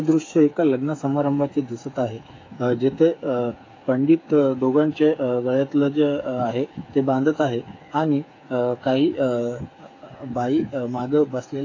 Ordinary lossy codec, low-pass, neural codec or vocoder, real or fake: AAC, 32 kbps; 7.2 kHz; codec, 16 kHz, 8 kbps, FreqCodec, smaller model; fake